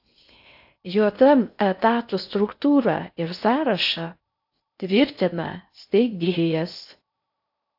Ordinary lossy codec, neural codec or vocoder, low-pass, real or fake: AAC, 48 kbps; codec, 16 kHz in and 24 kHz out, 0.6 kbps, FocalCodec, streaming, 4096 codes; 5.4 kHz; fake